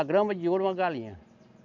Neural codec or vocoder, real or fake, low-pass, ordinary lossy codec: none; real; 7.2 kHz; none